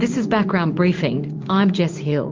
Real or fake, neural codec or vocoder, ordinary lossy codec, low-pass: fake; codec, 16 kHz in and 24 kHz out, 1 kbps, XY-Tokenizer; Opus, 32 kbps; 7.2 kHz